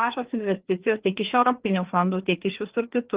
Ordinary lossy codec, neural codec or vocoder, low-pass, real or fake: Opus, 16 kbps; codec, 16 kHz, 2 kbps, FreqCodec, larger model; 3.6 kHz; fake